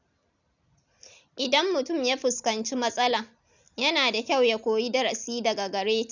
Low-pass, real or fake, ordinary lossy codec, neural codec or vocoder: 7.2 kHz; real; none; none